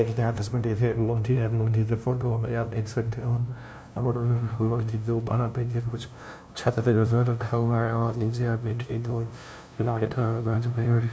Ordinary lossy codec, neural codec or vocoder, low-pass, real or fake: none; codec, 16 kHz, 0.5 kbps, FunCodec, trained on LibriTTS, 25 frames a second; none; fake